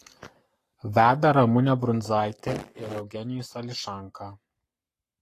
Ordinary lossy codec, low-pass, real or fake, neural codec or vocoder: AAC, 48 kbps; 14.4 kHz; fake; codec, 44.1 kHz, 7.8 kbps, Pupu-Codec